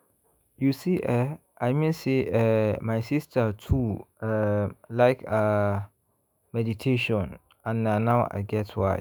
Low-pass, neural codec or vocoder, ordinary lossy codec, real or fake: none; autoencoder, 48 kHz, 128 numbers a frame, DAC-VAE, trained on Japanese speech; none; fake